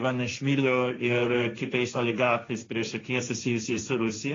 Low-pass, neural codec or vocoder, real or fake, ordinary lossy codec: 7.2 kHz; codec, 16 kHz, 1.1 kbps, Voila-Tokenizer; fake; AAC, 32 kbps